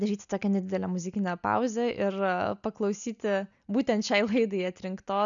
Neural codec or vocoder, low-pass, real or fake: none; 7.2 kHz; real